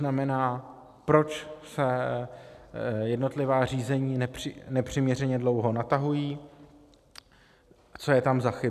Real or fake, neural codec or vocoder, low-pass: real; none; 14.4 kHz